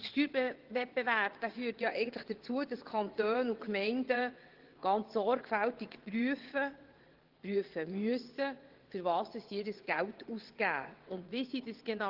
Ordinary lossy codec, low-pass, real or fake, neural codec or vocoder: Opus, 16 kbps; 5.4 kHz; fake; vocoder, 22.05 kHz, 80 mel bands, WaveNeXt